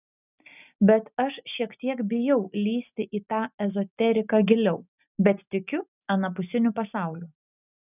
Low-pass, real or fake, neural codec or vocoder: 3.6 kHz; real; none